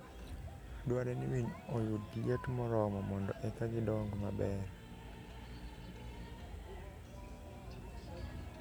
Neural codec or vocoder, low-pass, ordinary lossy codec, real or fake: none; none; none; real